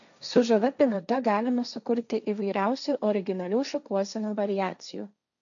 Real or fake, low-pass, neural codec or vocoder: fake; 7.2 kHz; codec, 16 kHz, 1.1 kbps, Voila-Tokenizer